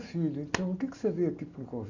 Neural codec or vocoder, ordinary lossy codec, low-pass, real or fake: none; none; 7.2 kHz; real